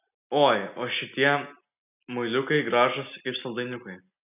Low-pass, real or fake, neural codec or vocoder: 3.6 kHz; real; none